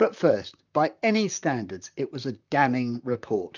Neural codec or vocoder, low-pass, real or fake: vocoder, 44.1 kHz, 128 mel bands, Pupu-Vocoder; 7.2 kHz; fake